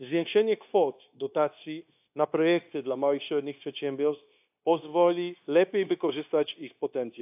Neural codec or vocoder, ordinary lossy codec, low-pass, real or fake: codec, 16 kHz, 0.9 kbps, LongCat-Audio-Codec; AAC, 32 kbps; 3.6 kHz; fake